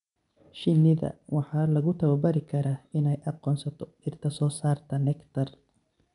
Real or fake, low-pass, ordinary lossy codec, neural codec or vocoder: real; 10.8 kHz; none; none